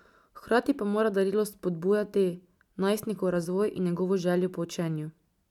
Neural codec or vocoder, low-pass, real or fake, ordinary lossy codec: none; 19.8 kHz; real; none